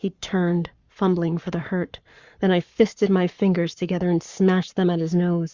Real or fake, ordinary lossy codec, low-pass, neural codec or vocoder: fake; Opus, 64 kbps; 7.2 kHz; codec, 44.1 kHz, 7.8 kbps, Pupu-Codec